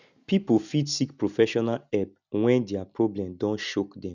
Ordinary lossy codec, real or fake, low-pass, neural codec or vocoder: none; real; 7.2 kHz; none